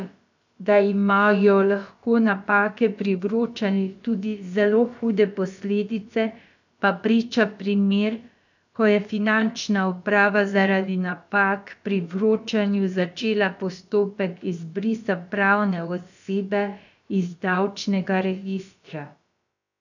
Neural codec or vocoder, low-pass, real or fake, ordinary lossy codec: codec, 16 kHz, about 1 kbps, DyCAST, with the encoder's durations; 7.2 kHz; fake; none